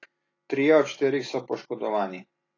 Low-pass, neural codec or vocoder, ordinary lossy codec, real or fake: 7.2 kHz; none; AAC, 32 kbps; real